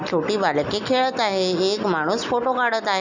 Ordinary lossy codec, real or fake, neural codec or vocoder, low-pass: none; real; none; 7.2 kHz